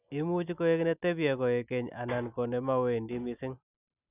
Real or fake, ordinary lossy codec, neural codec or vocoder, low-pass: real; none; none; 3.6 kHz